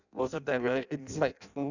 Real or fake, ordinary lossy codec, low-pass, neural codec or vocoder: fake; none; 7.2 kHz; codec, 16 kHz in and 24 kHz out, 0.6 kbps, FireRedTTS-2 codec